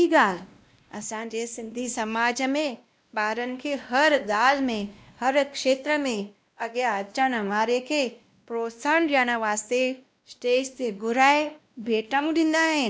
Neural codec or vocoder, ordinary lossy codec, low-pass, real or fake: codec, 16 kHz, 1 kbps, X-Codec, WavLM features, trained on Multilingual LibriSpeech; none; none; fake